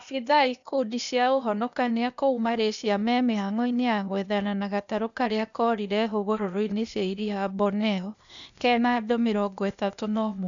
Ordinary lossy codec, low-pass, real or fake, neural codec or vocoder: none; 7.2 kHz; fake; codec, 16 kHz, 0.8 kbps, ZipCodec